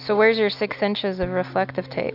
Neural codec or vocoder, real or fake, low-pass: none; real; 5.4 kHz